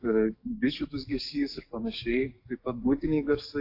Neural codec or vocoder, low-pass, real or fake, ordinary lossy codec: codec, 44.1 kHz, 7.8 kbps, DAC; 5.4 kHz; fake; AAC, 32 kbps